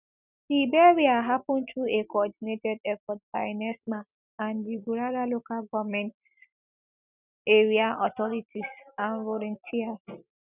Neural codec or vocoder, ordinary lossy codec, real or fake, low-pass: none; none; real; 3.6 kHz